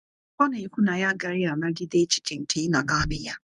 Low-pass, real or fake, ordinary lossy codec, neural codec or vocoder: 10.8 kHz; fake; none; codec, 24 kHz, 0.9 kbps, WavTokenizer, medium speech release version 1